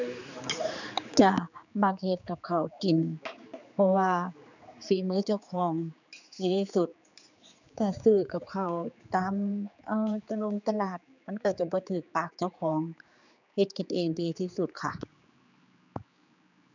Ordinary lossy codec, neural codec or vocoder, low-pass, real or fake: none; codec, 16 kHz, 4 kbps, X-Codec, HuBERT features, trained on general audio; 7.2 kHz; fake